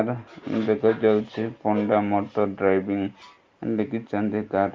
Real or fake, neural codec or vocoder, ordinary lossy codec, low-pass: real; none; Opus, 32 kbps; 7.2 kHz